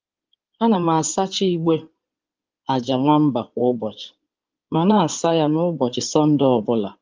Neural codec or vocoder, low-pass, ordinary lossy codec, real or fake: codec, 16 kHz in and 24 kHz out, 2.2 kbps, FireRedTTS-2 codec; 7.2 kHz; Opus, 32 kbps; fake